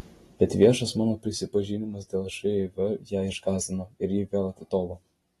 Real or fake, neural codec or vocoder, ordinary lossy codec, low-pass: real; none; AAC, 32 kbps; 19.8 kHz